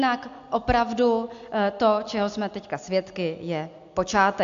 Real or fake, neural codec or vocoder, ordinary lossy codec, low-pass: real; none; AAC, 64 kbps; 7.2 kHz